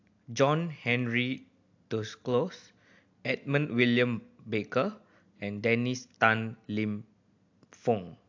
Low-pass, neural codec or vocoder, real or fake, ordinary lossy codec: 7.2 kHz; none; real; AAC, 48 kbps